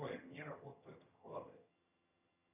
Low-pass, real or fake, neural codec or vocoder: 3.6 kHz; fake; vocoder, 22.05 kHz, 80 mel bands, HiFi-GAN